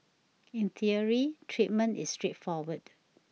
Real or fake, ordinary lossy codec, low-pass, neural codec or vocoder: real; none; none; none